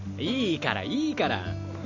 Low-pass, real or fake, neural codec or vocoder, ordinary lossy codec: 7.2 kHz; real; none; Opus, 64 kbps